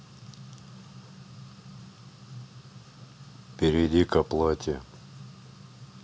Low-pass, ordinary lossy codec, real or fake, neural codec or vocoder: none; none; real; none